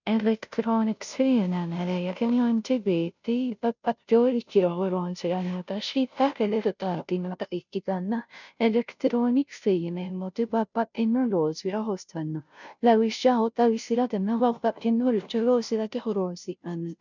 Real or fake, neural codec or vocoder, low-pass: fake; codec, 16 kHz, 0.5 kbps, FunCodec, trained on Chinese and English, 25 frames a second; 7.2 kHz